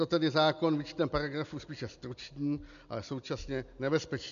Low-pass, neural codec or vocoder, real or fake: 7.2 kHz; none; real